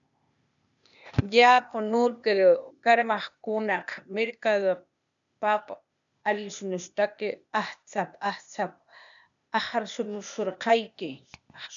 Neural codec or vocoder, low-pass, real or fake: codec, 16 kHz, 0.8 kbps, ZipCodec; 7.2 kHz; fake